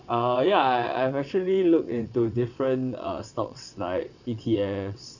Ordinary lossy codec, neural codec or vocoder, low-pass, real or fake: none; vocoder, 22.05 kHz, 80 mel bands, WaveNeXt; 7.2 kHz; fake